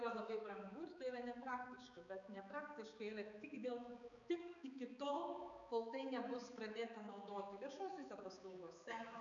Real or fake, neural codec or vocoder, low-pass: fake; codec, 16 kHz, 4 kbps, X-Codec, HuBERT features, trained on balanced general audio; 7.2 kHz